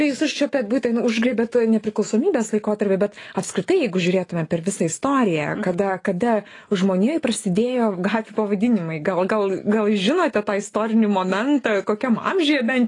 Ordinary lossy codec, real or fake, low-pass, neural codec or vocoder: AAC, 32 kbps; fake; 10.8 kHz; autoencoder, 48 kHz, 128 numbers a frame, DAC-VAE, trained on Japanese speech